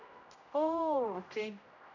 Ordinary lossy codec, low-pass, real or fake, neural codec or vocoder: none; 7.2 kHz; fake; codec, 16 kHz, 0.5 kbps, X-Codec, HuBERT features, trained on general audio